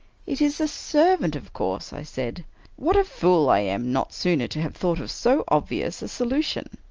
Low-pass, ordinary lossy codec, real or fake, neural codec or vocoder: 7.2 kHz; Opus, 24 kbps; real; none